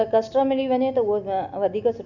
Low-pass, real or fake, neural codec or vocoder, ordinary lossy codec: 7.2 kHz; real; none; none